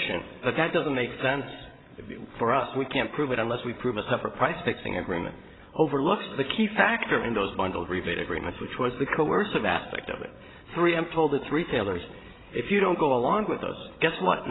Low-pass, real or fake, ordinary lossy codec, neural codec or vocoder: 7.2 kHz; fake; AAC, 16 kbps; codec, 16 kHz, 16 kbps, FreqCodec, larger model